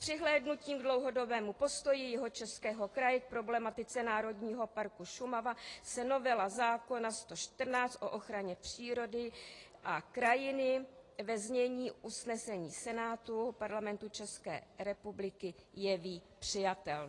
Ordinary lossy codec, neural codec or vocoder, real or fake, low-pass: AAC, 32 kbps; none; real; 10.8 kHz